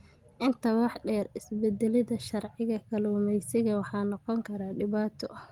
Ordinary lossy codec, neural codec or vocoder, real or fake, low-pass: Opus, 24 kbps; none; real; 19.8 kHz